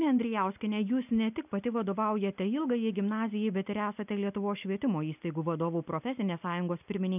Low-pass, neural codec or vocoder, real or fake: 3.6 kHz; none; real